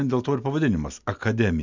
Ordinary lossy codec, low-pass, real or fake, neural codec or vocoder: MP3, 64 kbps; 7.2 kHz; real; none